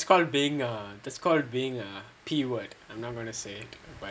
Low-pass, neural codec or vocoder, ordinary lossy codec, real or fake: none; none; none; real